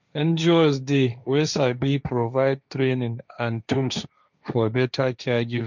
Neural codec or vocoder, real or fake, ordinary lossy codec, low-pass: codec, 16 kHz, 1.1 kbps, Voila-Tokenizer; fake; none; 7.2 kHz